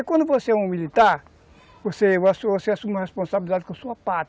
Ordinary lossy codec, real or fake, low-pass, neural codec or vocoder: none; real; none; none